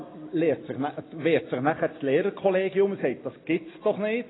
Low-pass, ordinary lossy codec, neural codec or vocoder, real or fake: 7.2 kHz; AAC, 16 kbps; none; real